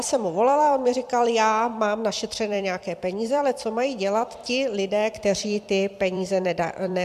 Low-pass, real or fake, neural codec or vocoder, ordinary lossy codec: 14.4 kHz; fake; vocoder, 44.1 kHz, 128 mel bands every 256 samples, BigVGAN v2; MP3, 96 kbps